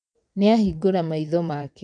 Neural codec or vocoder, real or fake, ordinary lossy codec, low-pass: vocoder, 22.05 kHz, 80 mel bands, WaveNeXt; fake; none; 9.9 kHz